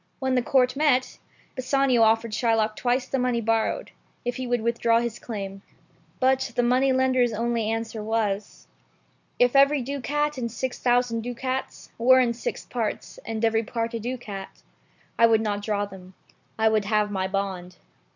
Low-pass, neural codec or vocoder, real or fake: 7.2 kHz; none; real